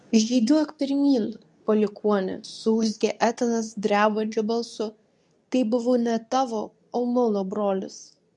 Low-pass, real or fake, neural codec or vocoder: 10.8 kHz; fake; codec, 24 kHz, 0.9 kbps, WavTokenizer, medium speech release version 2